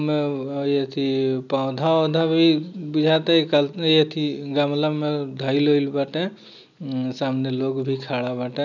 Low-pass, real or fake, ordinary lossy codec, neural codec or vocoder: 7.2 kHz; real; none; none